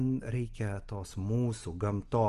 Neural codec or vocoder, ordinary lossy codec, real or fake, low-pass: none; Opus, 24 kbps; real; 10.8 kHz